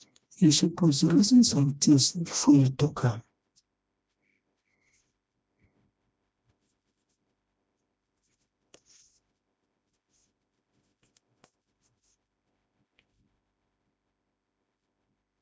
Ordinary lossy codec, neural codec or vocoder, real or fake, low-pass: none; codec, 16 kHz, 1 kbps, FreqCodec, smaller model; fake; none